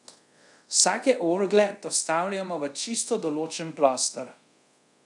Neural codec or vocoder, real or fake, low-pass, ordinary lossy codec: codec, 24 kHz, 0.5 kbps, DualCodec; fake; 10.8 kHz; none